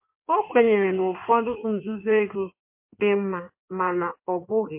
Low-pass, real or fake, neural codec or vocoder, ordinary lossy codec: 3.6 kHz; fake; codec, 16 kHz in and 24 kHz out, 1.1 kbps, FireRedTTS-2 codec; MP3, 32 kbps